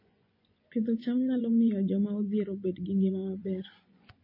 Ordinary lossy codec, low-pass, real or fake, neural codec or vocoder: MP3, 24 kbps; 5.4 kHz; fake; vocoder, 24 kHz, 100 mel bands, Vocos